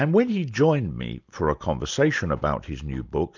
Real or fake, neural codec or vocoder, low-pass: real; none; 7.2 kHz